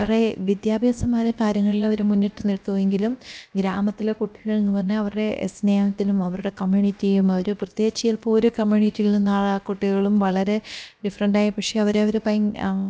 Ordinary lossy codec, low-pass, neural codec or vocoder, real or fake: none; none; codec, 16 kHz, about 1 kbps, DyCAST, with the encoder's durations; fake